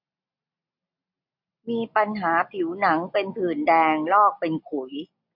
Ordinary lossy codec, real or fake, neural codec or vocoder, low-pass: MP3, 48 kbps; real; none; 5.4 kHz